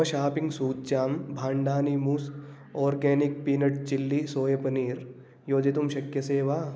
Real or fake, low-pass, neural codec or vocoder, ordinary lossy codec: real; none; none; none